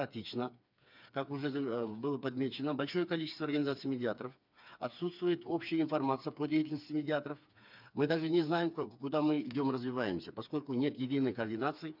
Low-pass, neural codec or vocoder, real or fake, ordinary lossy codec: 5.4 kHz; codec, 16 kHz, 4 kbps, FreqCodec, smaller model; fake; none